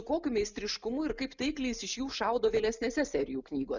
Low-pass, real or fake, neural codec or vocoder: 7.2 kHz; real; none